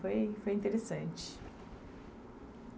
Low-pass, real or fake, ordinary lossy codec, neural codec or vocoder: none; real; none; none